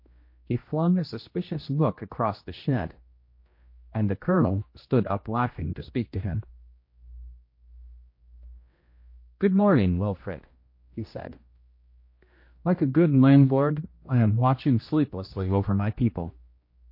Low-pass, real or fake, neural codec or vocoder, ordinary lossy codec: 5.4 kHz; fake; codec, 16 kHz, 1 kbps, X-Codec, HuBERT features, trained on general audio; MP3, 32 kbps